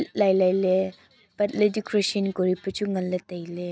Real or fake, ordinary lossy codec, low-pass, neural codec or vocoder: real; none; none; none